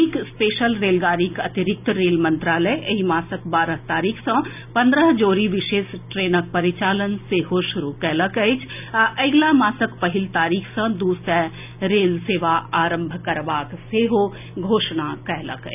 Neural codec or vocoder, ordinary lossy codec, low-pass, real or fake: none; none; 3.6 kHz; real